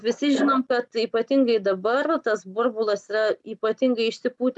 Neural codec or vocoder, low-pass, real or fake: none; 10.8 kHz; real